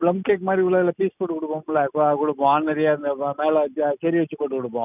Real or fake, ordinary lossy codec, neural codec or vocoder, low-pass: real; none; none; 3.6 kHz